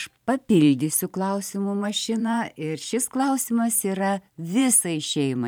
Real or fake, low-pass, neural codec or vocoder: fake; 19.8 kHz; vocoder, 44.1 kHz, 128 mel bands every 512 samples, BigVGAN v2